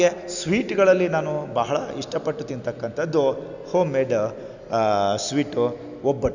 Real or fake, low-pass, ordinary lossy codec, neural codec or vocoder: real; 7.2 kHz; none; none